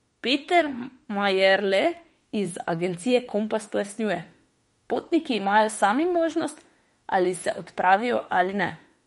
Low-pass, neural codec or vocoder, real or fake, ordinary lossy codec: 19.8 kHz; autoencoder, 48 kHz, 32 numbers a frame, DAC-VAE, trained on Japanese speech; fake; MP3, 48 kbps